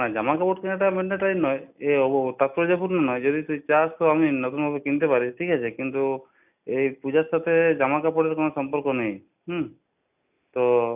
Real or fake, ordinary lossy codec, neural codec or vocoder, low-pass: real; none; none; 3.6 kHz